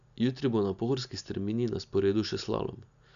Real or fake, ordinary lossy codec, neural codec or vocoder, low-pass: real; none; none; 7.2 kHz